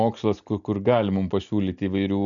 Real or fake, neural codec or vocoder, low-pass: real; none; 7.2 kHz